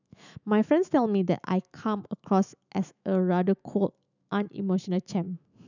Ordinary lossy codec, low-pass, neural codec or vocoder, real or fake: none; 7.2 kHz; autoencoder, 48 kHz, 128 numbers a frame, DAC-VAE, trained on Japanese speech; fake